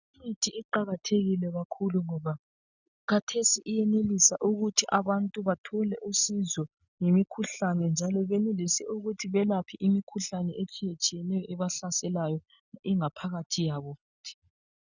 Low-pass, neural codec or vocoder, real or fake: 7.2 kHz; none; real